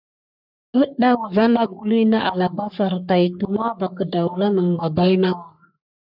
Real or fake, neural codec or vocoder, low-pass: fake; codec, 44.1 kHz, 3.4 kbps, Pupu-Codec; 5.4 kHz